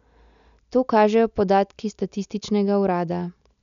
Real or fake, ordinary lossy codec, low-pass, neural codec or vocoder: real; none; 7.2 kHz; none